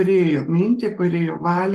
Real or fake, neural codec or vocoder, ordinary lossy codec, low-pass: fake; codec, 44.1 kHz, 7.8 kbps, Pupu-Codec; Opus, 24 kbps; 14.4 kHz